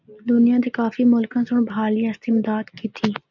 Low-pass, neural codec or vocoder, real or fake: 7.2 kHz; none; real